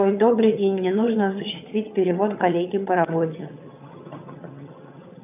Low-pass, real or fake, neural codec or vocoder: 3.6 kHz; fake; vocoder, 22.05 kHz, 80 mel bands, HiFi-GAN